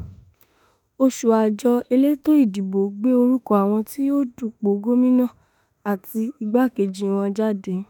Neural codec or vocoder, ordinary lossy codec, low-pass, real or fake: autoencoder, 48 kHz, 32 numbers a frame, DAC-VAE, trained on Japanese speech; none; 19.8 kHz; fake